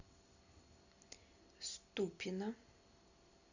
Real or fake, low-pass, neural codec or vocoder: real; 7.2 kHz; none